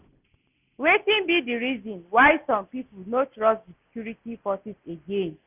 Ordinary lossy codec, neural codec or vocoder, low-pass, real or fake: none; none; 3.6 kHz; real